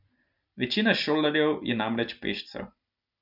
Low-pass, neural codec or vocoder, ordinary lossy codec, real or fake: 5.4 kHz; none; none; real